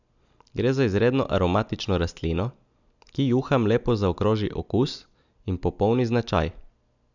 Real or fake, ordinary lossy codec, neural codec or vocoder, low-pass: real; none; none; 7.2 kHz